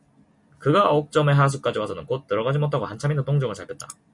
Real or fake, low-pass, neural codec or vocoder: real; 10.8 kHz; none